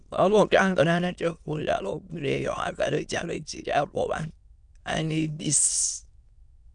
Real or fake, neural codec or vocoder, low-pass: fake; autoencoder, 22.05 kHz, a latent of 192 numbers a frame, VITS, trained on many speakers; 9.9 kHz